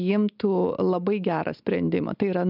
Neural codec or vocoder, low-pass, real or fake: none; 5.4 kHz; real